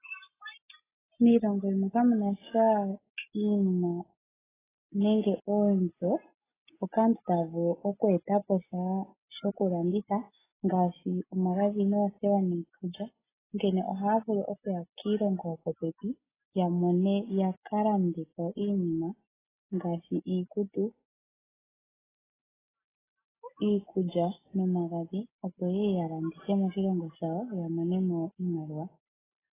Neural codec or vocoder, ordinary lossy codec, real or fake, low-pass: none; AAC, 16 kbps; real; 3.6 kHz